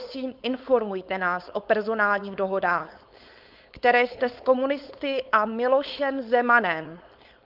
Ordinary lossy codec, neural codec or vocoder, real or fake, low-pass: Opus, 24 kbps; codec, 16 kHz, 4.8 kbps, FACodec; fake; 5.4 kHz